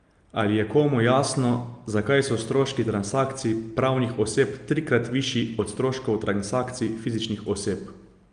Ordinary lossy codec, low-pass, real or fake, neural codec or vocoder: Opus, 32 kbps; 9.9 kHz; real; none